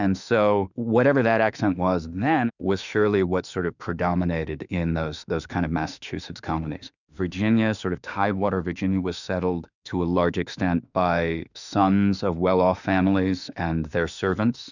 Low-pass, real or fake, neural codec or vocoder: 7.2 kHz; fake; autoencoder, 48 kHz, 32 numbers a frame, DAC-VAE, trained on Japanese speech